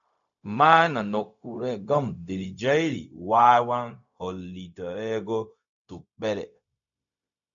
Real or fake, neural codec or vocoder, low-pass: fake; codec, 16 kHz, 0.4 kbps, LongCat-Audio-Codec; 7.2 kHz